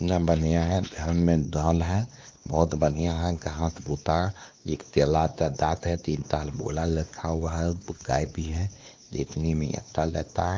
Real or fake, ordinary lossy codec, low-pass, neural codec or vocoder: fake; Opus, 32 kbps; 7.2 kHz; codec, 16 kHz, 2 kbps, X-Codec, WavLM features, trained on Multilingual LibriSpeech